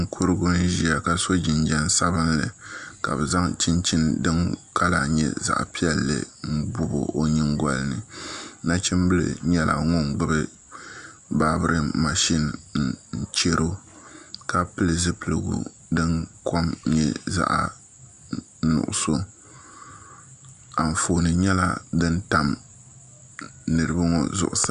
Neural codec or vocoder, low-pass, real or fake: none; 10.8 kHz; real